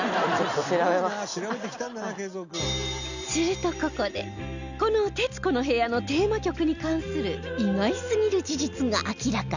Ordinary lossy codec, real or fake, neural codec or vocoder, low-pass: none; real; none; 7.2 kHz